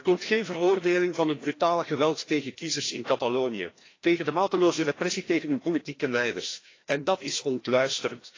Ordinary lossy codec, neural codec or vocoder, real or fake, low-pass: AAC, 32 kbps; codec, 16 kHz, 1 kbps, FreqCodec, larger model; fake; 7.2 kHz